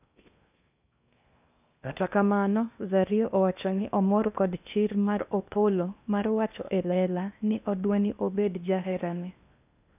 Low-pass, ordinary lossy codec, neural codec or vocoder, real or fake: 3.6 kHz; none; codec, 16 kHz in and 24 kHz out, 0.8 kbps, FocalCodec, streaming, 65536 codes; fake